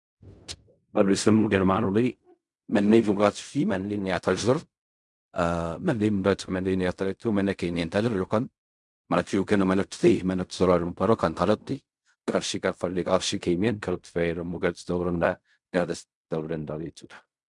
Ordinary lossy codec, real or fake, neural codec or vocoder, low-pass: MP3, 96 kbps; fake; codec, 16 kHz in and 24 kHz out, 0.4 kbps, LongCat-Audio-Codec, fine tuned four codebook decoder; 10.8 kHz